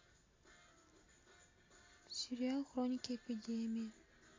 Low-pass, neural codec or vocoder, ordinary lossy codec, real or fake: 7.2 kHz; none; AAC, 32 kbps; real